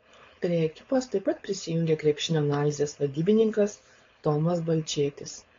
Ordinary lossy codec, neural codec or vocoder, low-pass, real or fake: AAC, 32 kbps; codec, 16 kHz, 4.8 kbps, FACodec; 7.2 kHz; fake